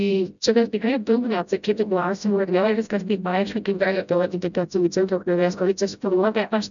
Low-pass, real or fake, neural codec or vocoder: 7.2 kHz; fake; codec, 16 kHz, 0.5 kbps, FreqCodec, smaller model